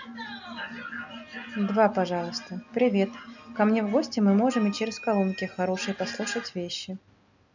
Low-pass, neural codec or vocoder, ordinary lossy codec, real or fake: 7.2 kHz; none; none; real